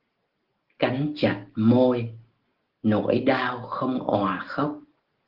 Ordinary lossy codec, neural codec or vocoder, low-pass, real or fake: Opus, 16 kbps; none; 5.4 kHz; real